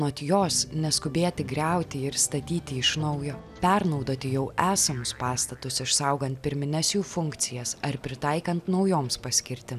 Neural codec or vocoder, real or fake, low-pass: none; real; 14.4 kHz